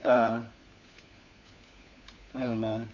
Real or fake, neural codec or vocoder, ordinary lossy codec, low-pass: fake; codec, 16 kHz, 4 kbps, FunCodec, trained on LibriTTS, 50 frames a second; none; 7.2 kHz